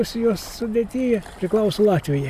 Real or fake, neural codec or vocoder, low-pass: real; none; 14.4 kHz